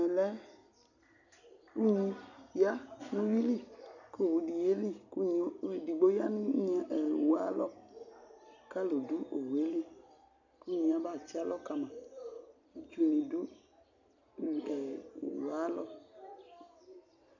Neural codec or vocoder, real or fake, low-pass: none; real; 7.2 kHz